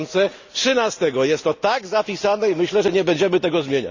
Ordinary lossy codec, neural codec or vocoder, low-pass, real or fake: Opus, 64 kbps; none; 7.2 kHz; real